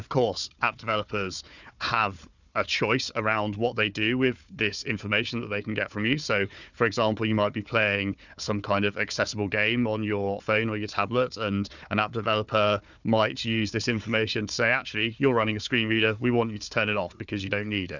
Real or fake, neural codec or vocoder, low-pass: fake; codec, 16 kHz, 4 kbps, FunCodec, trained on Chinese and English, 50 frames a second; 7.2 kHz